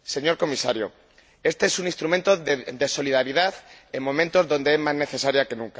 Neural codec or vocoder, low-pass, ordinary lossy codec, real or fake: none; none; none; real